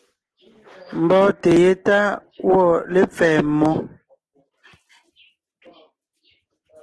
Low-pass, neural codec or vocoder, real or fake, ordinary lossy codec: 10.8 kHz; none; real; Opus, 16 kbps